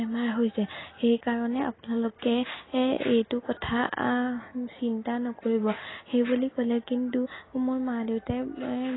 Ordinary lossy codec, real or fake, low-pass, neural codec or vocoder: AAC, 16 kbps; real; 7.2 kHz; none